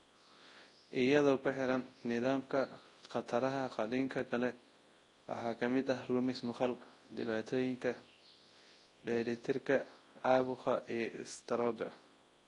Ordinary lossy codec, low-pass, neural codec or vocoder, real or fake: AAC, 32 kbps; 10.8 kHz; codec, 24 kHz, 0.9 kbps, WavTokenizer, large speech release; fake